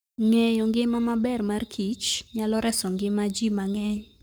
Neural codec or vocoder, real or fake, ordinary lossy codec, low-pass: codec, 44.1 kHz, 7.8 kbps, Pupu-Codec; fake; none; none